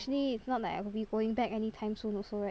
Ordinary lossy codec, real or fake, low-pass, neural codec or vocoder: none; real; none; none